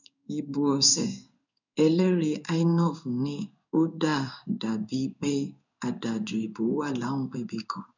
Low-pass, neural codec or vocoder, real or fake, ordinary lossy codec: 7.2 kHz; codec, 16 kHz in and 24 kHz out, 1 kbps, XY-Tokenizer; fake; none